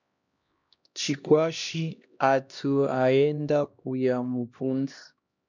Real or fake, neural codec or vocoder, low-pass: fake; codec, 16 kHz, 1 kbps, X-Codec, HuBERT features, trained on LibriSpeech; 7.2 kHz